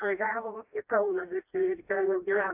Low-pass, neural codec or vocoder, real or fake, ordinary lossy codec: 3.6 kHz; codec, 16 kHz, 1 kbps, FreqCodec, smaller model; fake; AAC, 24 kbps